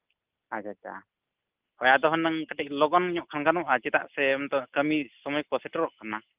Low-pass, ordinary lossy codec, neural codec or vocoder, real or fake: 3.6 kHz; Opus, 32 kbps; none; real